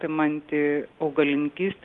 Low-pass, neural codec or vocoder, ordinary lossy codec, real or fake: 10.8 kHz; none; Opus, 24 kbps; real